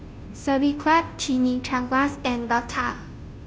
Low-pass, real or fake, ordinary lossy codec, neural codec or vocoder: none; fake; none; codec, 16 kHz, 0.5 kbps, FunCodec, trained on Chinese and English, 25 frames a second